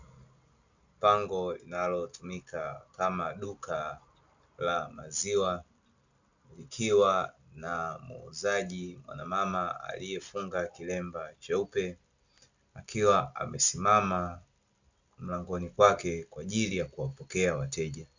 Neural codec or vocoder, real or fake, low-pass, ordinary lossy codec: none; real; 7.2 kHz; Opus, 64 kbps